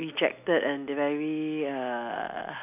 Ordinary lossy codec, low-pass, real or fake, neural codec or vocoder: none; 3.6 kHz; real; none